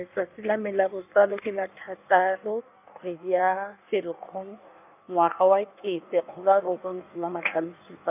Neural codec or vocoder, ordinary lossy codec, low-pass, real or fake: codec, 16 kHz in and 24 kHz out, 1.1 kbps, FireRedTTS-2 codec; none; 3.6 kHz; fake